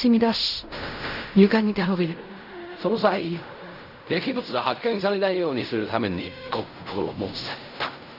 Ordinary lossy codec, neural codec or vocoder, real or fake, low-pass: none; codec, 16 kHz in and 24 kHz out, 0.4 kbps, LongCat-Audio-Codec, fine tuned four codebook decoder; fake; 5.4 kHz